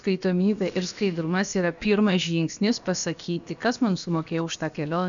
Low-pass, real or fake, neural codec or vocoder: 7.2 kHz; fake; codec, 16 kHz, about 1 kbps, DyCAST, with the encoder's durations